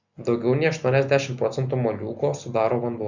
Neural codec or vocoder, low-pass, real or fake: none; 7.2 kHz; real